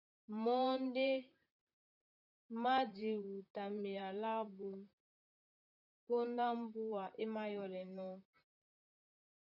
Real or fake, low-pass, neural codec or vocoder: fake; 5.4 kHz; vocoder, 44.1 kHz, 128 mel bands, Pupu-Vocoder